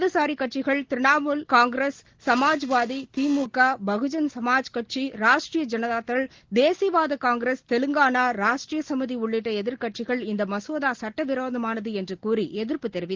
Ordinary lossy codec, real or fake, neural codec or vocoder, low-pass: Opus, 16 kbps; real; none; 7.2 kHz